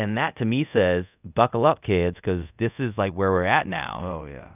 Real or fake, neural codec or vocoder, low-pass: fake; codec, 24 kHz, 0.5 kbps, DualCodec; 3.6 kHz